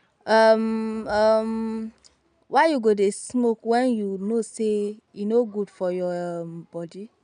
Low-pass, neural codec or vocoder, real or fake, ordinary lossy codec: 9.9 kHz; none; real; none